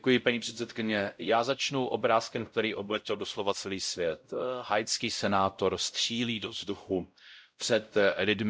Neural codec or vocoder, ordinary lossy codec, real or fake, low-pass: codec, 16 kHz, 0.5 kbps, X-Codec, WavLM features, trained on Multilingual LibriSpeech; none; fake; none